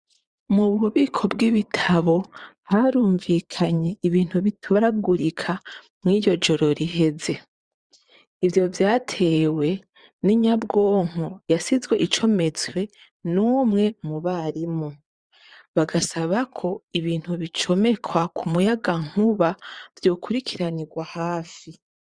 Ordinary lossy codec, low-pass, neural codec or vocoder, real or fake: Opus, 64 kbps; 9.9 kHz; vocoder, 22.05 kHz, 80 mel bands, Vocos; fake